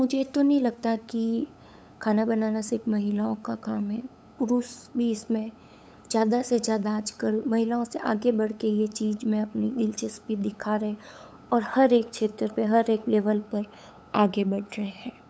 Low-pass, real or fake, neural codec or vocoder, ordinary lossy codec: none; fake; codec, 16 kHz, 8 kbps, FunCodec, trained on LibriTTS, 25 frames a second; none